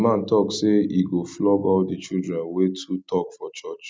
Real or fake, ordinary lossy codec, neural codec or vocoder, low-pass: real; none; none; none